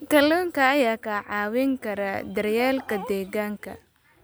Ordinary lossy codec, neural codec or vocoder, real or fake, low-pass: none; none; real; none